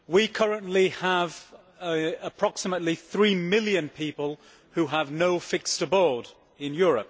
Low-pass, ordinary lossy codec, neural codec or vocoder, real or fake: none; none; none; real